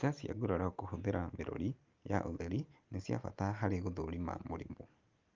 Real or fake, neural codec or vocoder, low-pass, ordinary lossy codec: real; none; 7.2 kHz; Opus, 32 kbps